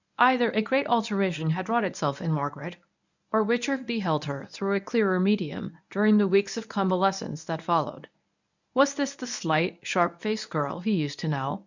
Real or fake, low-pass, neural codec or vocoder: fake; 7.2 kHz; codec, 24 kHz, 0.9 kbps, WavTokenizer, medium speech release version 1